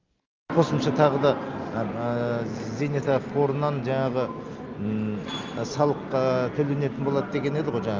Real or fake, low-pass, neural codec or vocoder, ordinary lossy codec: real; 7.2 kHz; none; Opus, 24 kbps